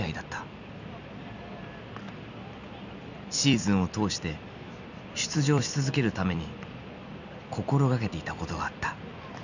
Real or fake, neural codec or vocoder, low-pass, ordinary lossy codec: fake; vocoder, 44.1 kHz, 128 mel bands every 256 samples, BigVGAN v2; 7.2 kHz; none